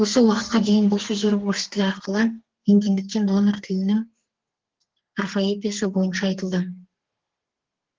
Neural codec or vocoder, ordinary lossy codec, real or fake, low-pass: codec, 32 kHz, 1.9 kbps, SNAC; Opus, 16 kbps; fake; 7.2 kHz